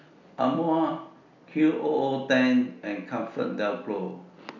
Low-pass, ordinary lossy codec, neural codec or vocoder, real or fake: 7.2 kHz; none; none; real